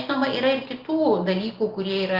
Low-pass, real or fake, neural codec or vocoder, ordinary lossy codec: 5.4 kHz; real; none; Opus, 32 kbps